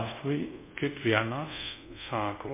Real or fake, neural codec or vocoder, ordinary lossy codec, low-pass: fake; codec, 24 kHz, 0.5 kbps, DualCodec; MP3, 24 kbps; 3.6 kHz